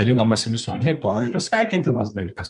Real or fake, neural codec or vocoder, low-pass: fake; codec, 24 kHz, 1 kbps, SNAC; 10.8 kHz